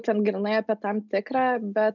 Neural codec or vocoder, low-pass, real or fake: none; 7.2 kHz; real